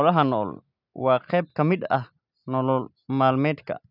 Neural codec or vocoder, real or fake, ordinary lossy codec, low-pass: none; real; none; 5.4 kHz